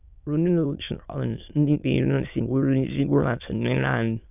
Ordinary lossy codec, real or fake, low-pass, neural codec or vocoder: none; fake; 3.6 kHz; autoencoder, 22.05 kHz, a latent of 192 numbers a frame, VITS, trained on many speakers